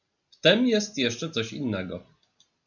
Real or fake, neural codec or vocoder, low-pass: real; none; 7.2 kHz